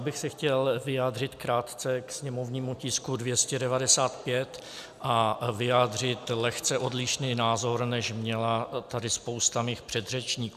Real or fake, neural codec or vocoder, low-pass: real; none; 14.4 kHz